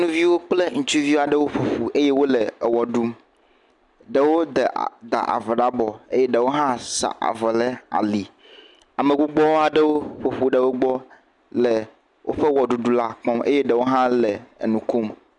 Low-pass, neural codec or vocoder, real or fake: 10.8 kHz; none; real